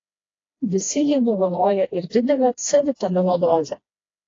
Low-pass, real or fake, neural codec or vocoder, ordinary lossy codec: 7.2 kHz; fake; codec, 16 kHz, 1 kbps, FreqCodec, smaller model; AAC, 32 kbps